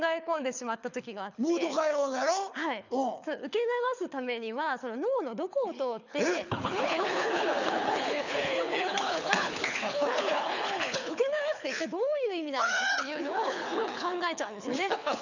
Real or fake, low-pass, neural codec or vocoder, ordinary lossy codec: fake; 7.2 kHz; codec, 24 kHz, 6 kbps, HILCodec; none